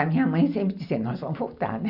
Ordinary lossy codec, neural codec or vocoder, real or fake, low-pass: none; none; real; 5.4 kHz